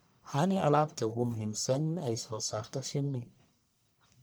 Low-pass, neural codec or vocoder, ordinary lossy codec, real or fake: none; codec, 44.1 kHz, 1.7 kbps, Pupu-Codec; none; fake